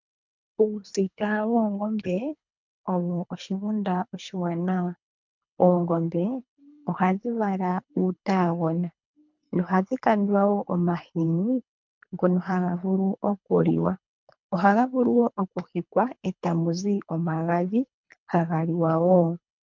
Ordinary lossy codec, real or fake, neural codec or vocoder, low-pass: MP3, 64 kbps; fake; codec, 24 kHz, 3 kbps, HILCodec; 7.2 kHz